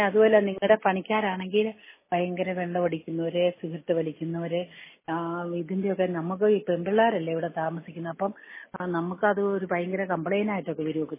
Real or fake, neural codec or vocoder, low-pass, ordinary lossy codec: real; none; 3.6 kHz; MP3, 16 kbps